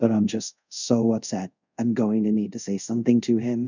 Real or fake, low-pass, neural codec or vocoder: fake; 7.2 kHz; codec, 24 kHz, 0.5 kbps, DualCodec